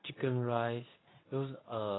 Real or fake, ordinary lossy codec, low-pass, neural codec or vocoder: fake; AAC, 16 kbps; 7.2 kHz; codec, 24 kHz, 0.9 kbps, WavTokenizer, medium speech release version 2